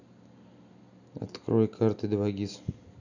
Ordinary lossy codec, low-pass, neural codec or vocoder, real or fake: none; 7.2 kHz; none; real